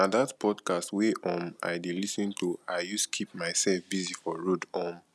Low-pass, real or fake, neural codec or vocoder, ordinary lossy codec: none; real; none; none